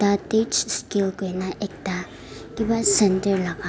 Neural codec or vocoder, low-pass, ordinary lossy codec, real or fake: none; none; none; real